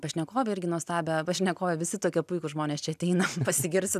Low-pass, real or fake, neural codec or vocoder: 14.4 kHz; real; none